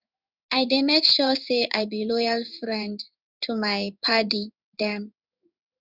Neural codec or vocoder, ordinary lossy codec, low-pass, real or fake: codec, 16 kHz in and 24 kHz out, 1 kbps, XY-Tokenizer; none; 5.4 kHz; fake